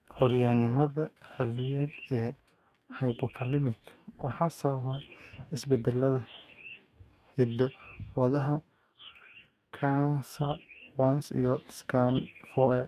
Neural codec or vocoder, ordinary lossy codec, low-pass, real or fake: codec, 44.1 kHz, 2.6 kbps, DAC; none; 14.4 kHz; fake